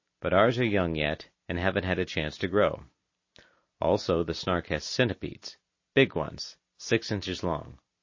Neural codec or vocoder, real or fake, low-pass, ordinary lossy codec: none; real; 7.2 kHz; MP3, 32 kbps